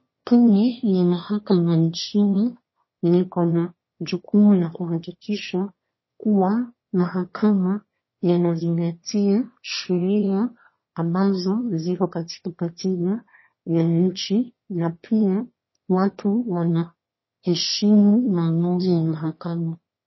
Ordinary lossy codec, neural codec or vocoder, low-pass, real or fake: MP3, 24 kbps; autoencoder, 22.05 kHz, a latent of 192 numbers a frame, VITS, trained on one speaker; 7.2 kHz; fake